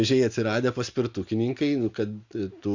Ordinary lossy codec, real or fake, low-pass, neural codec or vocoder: Opus, 64 kbps; real; 7.2 kHz; none